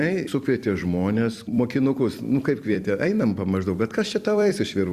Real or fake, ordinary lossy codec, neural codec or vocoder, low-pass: fake; Opus, 64 kbps; vocoder, 44.1 kHz, 128 mel bands every 256 samples, BigVGAN v2; 14.4 kHz